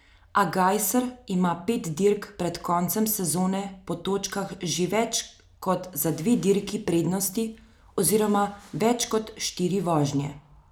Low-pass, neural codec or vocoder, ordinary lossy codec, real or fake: none; none; none; real